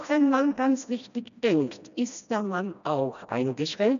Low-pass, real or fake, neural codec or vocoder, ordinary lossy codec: 7.2 kHz; fake; codec, 16 kHz, 1 kbps, FreqCodec, smaller model; none